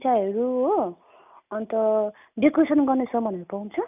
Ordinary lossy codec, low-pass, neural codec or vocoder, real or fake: none; 3.6 kHz; none; real